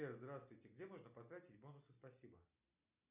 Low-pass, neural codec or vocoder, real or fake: 3.6 kHz; none; real